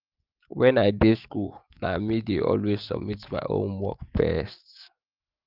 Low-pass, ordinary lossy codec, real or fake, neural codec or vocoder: 5.4 kHz; Opus, 24 kbps; fake; autoencoder, 48 kHz, 128 numbers a frame, DAC-VAE, trained on Japanese speech